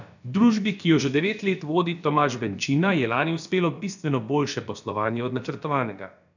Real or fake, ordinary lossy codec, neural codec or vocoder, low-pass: fake; none; codec, 16 kHz, about 1 kbps, DyCAST, with the encoder's durations; 7.2 kHz